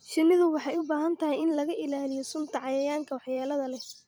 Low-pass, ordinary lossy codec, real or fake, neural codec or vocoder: none; none; fake; vocoder, 44.1 kHz, 128 mel bands every 256 samples, BigVGAN v2